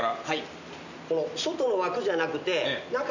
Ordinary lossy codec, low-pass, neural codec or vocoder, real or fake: none; 7.2 kHz; none; real